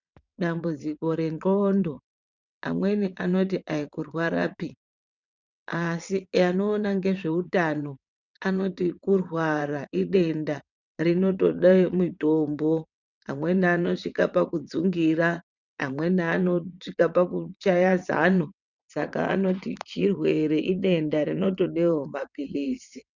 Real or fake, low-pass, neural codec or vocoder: fake; 7.2 kHz; vocoder, 22.05 kHz, 80 mel bands, WaveNeXt